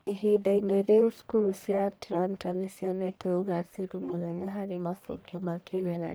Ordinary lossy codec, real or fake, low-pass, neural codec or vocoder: none; fake; none; codec, 44.1 kHz, 1.7 kbps, Pupu-Codec